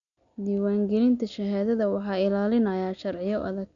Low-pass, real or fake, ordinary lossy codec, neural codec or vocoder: 7.2 kHz; real; none; none